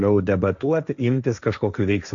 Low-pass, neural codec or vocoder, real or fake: 7.2 kHz; codec, 16 kHz, 1.1 kbps, Voila-Tokenizer; fake